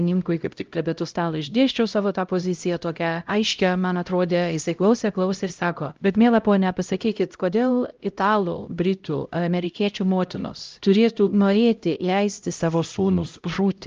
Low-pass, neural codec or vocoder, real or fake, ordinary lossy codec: 7.2 kHz; codec, 16 kHz, 0.5 kbps, X-Codec, HuBERT features, trained on LibriSpeech; fake; Opus, 24 kbps